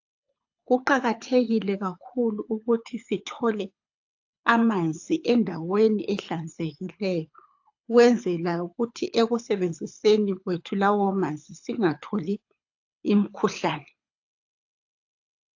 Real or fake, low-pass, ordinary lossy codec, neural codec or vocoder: fake; 7.2 kHz; AAC, 48 kbps; codec, 24 kHz, 6 kbps, HILCodec